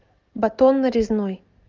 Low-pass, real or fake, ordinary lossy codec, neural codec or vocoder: 7.2 kHz; real; Opus, 24 kbps; none